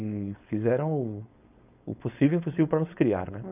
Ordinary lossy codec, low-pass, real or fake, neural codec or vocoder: none; 3.6 kHz; fake; codec, 16 kHz, 4.8 kbps, FACodec